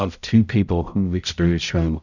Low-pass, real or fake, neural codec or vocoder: 7.2 kHz; fake; codec, 16 kHz, 0.5 kbps, X-Codec, HuBERT features, trained on balanced general audio